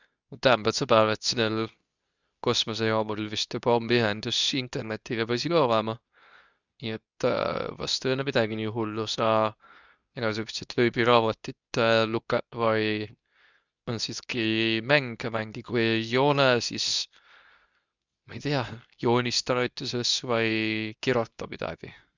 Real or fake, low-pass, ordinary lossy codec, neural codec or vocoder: fake; 7.2 kHz; none; codec, 24 kHz, 0.9 kbps, WavTokenizer, medium speech release version 2